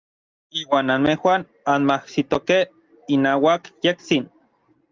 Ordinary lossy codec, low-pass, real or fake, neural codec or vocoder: Opus, 32 kbps; 7.2 kHz; real; none